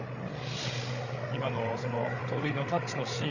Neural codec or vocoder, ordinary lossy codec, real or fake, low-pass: codec, 16 kHz, 8 kbps, FreqCodec, larger model; none; fake; 7.2 kHz